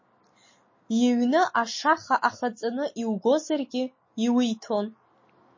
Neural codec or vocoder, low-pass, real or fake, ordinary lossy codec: none; 7.2 kHz; real; MP3, 32 kbps